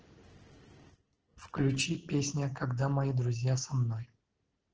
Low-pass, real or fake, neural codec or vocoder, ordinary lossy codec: 7.2 kHz; real; none; Opus, 16 kbps